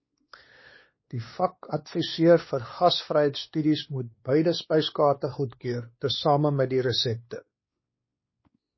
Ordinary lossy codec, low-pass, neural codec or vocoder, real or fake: MP3, 24 kbps; 7.2 kHz; codec, 16 kHz, 2 kbps, X-Codec, WavLM features, trained on Multilingual LibriSpeech; fake